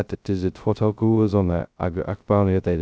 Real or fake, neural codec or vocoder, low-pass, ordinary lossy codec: fake; codec, 16 kHz, 0.2 kbps, FocalCodec; none; none